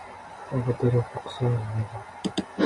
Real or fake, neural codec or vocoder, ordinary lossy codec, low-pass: real; none; MP3, 64 kbps; 10.8 kHz